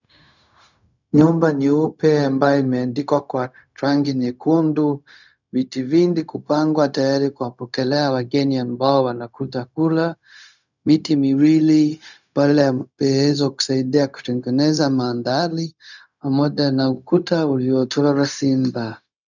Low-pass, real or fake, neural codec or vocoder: 7.2 kHz; fake; codec, 16 kHz, 0.4 kbps, LongCat-Audio-Codec